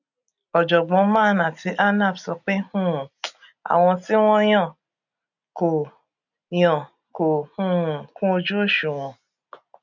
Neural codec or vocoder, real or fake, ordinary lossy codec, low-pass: none; real; none; 7.2 kHz